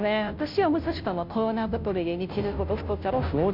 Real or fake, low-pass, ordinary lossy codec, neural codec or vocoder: fake; 5.4 kHz; MP3, 48 kbps; codec, 16 kHz, 0.5 kbps, FunCodec, trained on Chinese and English, 25 frames a second